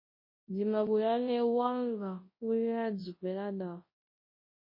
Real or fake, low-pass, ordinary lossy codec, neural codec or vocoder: fake; 5.4 kHz; MP3, 24 kbps; codec, 24 kHz, 0.9 kbps, WavTokenizer, large speech release